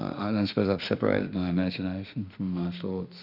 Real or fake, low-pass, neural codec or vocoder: fake; 5.4 kHz; autoencoder, 48 kHz, 32 numbers a frame, DAC-VAE, trained on Japanese speech